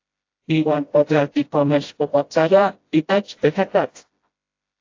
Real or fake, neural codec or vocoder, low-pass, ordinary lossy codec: fake; codec, 16 kHz, 0.5 kbps, FreqCodec, smaller model; 7.2 kHz; AAC, 48 kbps